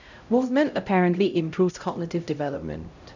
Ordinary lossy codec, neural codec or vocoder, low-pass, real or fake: none; codec, 16 kHz, 0.5 kbps, X-Codec, HuBERT features, trained on LibriSpeech; 7.2 kHz; fake